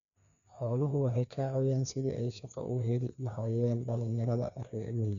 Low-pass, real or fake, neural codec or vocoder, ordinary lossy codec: 7.2 kHz; fake; codec, 16 kHz, 2 kbps, FreqCodec, larger model; MP3, 96 kbps